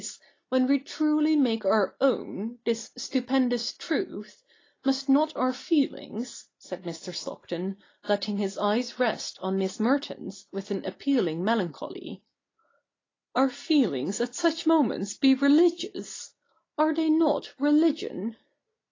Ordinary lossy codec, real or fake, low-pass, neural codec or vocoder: AAC, 32 kbps; real; 7.2 kHz; none